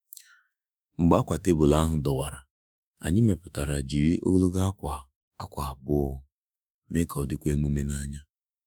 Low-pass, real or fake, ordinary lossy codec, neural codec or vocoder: none; fake; none; autoencoder, 48 kHz, 32 numbers a frame, DAC-VAE, trained on Japanese speech